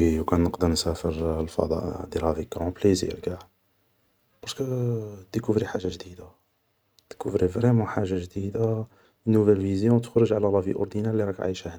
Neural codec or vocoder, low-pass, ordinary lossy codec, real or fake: none; none; none; real